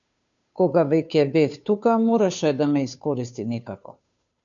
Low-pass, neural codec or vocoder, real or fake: 7.2 kHz; codec, 16 kHz, 2 kbps, FunCodec, trained on Chinese and English, 25 frames a second; fake